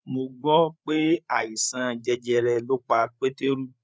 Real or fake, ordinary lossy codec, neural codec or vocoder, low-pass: fake; none; codec, 16 kHz, 8 kbps, FreqCodec, larger model; none